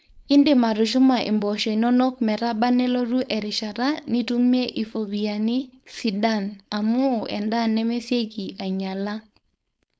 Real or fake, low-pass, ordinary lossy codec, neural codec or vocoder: fake; none; none; codec, 16 kHz, 4.8 kbps, FACodec